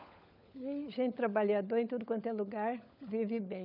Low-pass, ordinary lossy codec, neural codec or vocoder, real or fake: 5.4 kHz; none; codec, 16 kHz, 16 kbps, FunCodec, trained on LibriTTS, 50 frames a second; fake